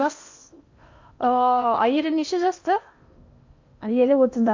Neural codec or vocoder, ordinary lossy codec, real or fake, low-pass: codec, 16 kHz in and 24 kHz out, 0.8 kbps, FocalCodec, streaming, 65536 codes; MP3, 48 kbps; fake; 7.2 kHz